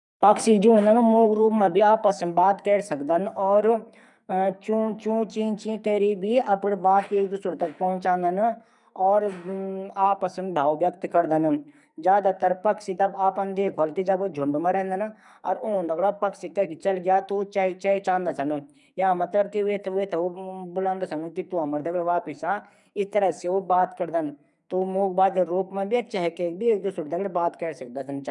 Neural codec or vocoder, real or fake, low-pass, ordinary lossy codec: codec, 44.1 kHz, 2.6 kbps, SNAC; fake; 10.8 kHz; none